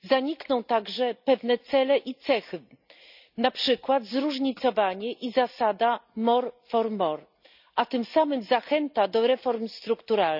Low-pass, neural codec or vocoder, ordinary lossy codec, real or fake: 5.4 kHz; none; none; real